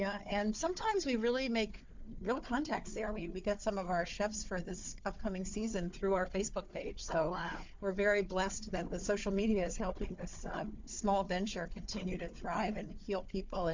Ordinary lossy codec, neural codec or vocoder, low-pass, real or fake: MP3, 64 kbps; codec, 16 kHz, 4 kbps, FunCodec, trained on Chinese and English, 50 frames a second; 7.2 kHz; fake